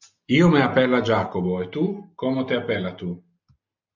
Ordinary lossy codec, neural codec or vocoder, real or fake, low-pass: MP3, 64 kbps; none; real; 7.2 kHz